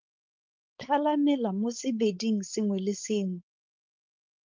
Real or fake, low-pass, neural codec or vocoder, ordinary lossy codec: fake; 7.2 kHz; codec, 16 kHz, 4.8 kbps, FACodec; Opus, 32 kbps